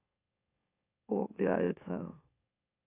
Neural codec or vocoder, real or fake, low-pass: autoencoder, 44.1 kHz, a latent of 192 numbers a frame, MeloTTS; fake; 3.6 kHz